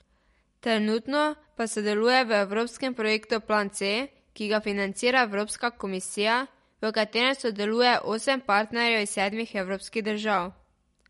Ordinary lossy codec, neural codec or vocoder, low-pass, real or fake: MP3, 48 kbps; none; 19.8 kHz; real